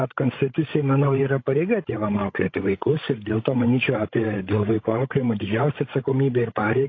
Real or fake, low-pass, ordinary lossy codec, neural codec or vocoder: fake; 7.2 kHz; AAC, 32 kbps; codec, 16 kHz, 16 kbps, FreqCodec, larger model